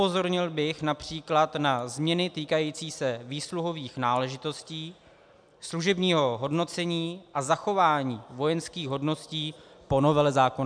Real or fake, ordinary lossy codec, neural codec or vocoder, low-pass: real; MP3, 96 kbps; none; 9.9 kHz